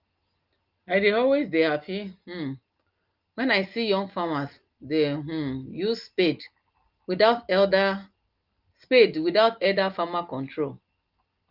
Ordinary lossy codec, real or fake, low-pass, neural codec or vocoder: Opus, 32 kbps; real; 5.4 kHz; none